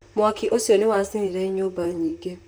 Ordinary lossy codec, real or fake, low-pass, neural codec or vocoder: none; fake; none; vocoder, 44.1 kHz, 128 mel bands, Pupu-Vocoder